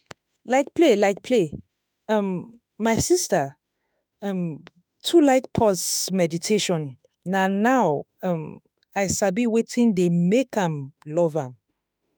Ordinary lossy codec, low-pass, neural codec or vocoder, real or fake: none; none; autoencoder, 48 kHz, 32 numbers a frame, DAC-VAE, trained on Japanese speech; fake